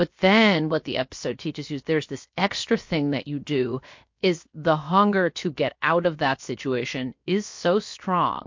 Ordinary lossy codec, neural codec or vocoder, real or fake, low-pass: MP3, 48 kbps; codec, 16 kHz, 0.7 kbps, FocalCodec; fake; 7.2 kHz